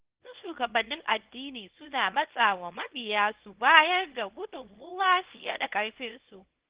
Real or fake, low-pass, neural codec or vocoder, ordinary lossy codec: fake; 3.6 kHz; codec, 24 kHz, 0.9 kbps, WavTokenizer, small release; Opus, 16 kbps